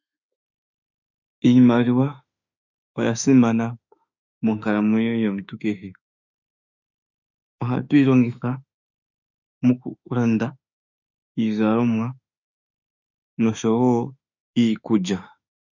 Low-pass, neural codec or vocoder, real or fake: 7.2 kHz; autoencoder, 48 kHz, 32 numbers a frame, DAC-VAE, trained on Japanese speech; fake